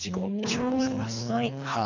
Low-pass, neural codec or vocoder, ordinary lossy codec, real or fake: 7.2 kHz; codec, 24 kHz, 3 kbps, HILCodec; none; fake